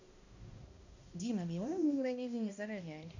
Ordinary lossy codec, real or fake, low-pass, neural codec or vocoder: none; fake; 7.2 kHz; codec, 16 kHz, 1 kbps, X-Codec, HuBERT features, trained on balanced general audio